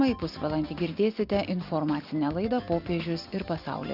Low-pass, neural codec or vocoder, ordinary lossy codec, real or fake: 5.4 kHz; none; Opus, 64 kbps; real